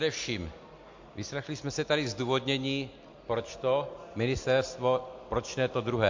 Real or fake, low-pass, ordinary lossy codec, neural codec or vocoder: real; 7.2 kHz; MP3, 48 kbps; none